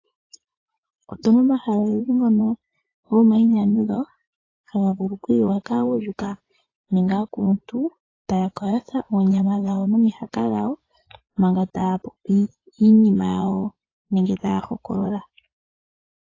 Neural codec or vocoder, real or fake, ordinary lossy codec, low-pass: vocoder, 24 kHz, 100 mel bands, Vocos; fake; AAC, 32 kbps; 7.2 kHz